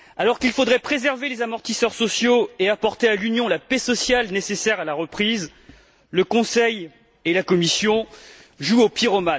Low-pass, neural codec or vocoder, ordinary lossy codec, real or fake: none; none; none; real